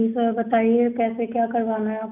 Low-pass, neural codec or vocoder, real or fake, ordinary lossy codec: 3.6 kHz; none; real; none